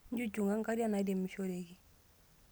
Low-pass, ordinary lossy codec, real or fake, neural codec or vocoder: none; none; real; none